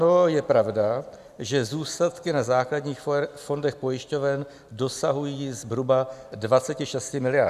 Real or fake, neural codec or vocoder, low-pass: real; none; 14.4 kHz